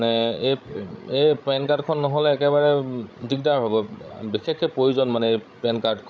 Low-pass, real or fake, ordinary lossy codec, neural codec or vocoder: none; fake; none; codec, 16 kHz, 16 kbps, FreqCodec, larger model